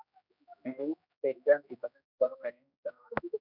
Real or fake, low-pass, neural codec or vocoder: fake; 5.4 kHz; codec, 16 kHz, 1 kbps, X-Codec, HuBERT features, trained on general audio